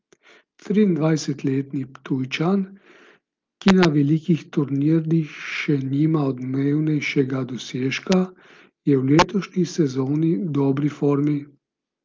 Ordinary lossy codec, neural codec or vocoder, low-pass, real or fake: Opus, 24 kbps; none; 7.2 kHz; real